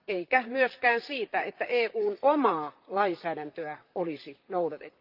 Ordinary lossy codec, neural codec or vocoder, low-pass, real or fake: Opus, 32 kbps; vocoder, 44.1 kHz, 128 mel bands, Pupu-Vocoder; 5.4 kHz; fake